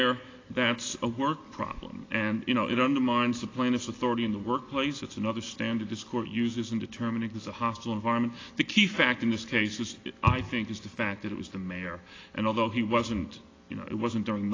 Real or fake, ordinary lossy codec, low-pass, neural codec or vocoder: real; AAC, 32 kbps; 7.2 kHz; none